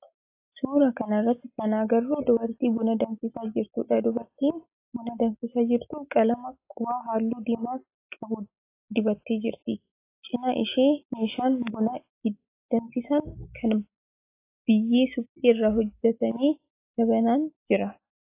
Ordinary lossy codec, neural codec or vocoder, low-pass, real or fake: AAC, 24 kbps; none; 3.6 kHz; real